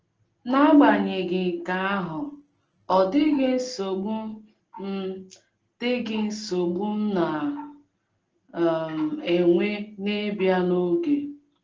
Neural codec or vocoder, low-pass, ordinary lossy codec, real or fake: none; 7.2 kHz; Opus, 16 kbps; real